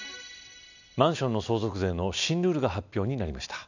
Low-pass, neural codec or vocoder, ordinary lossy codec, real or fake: 7.2 kHz; none; none; real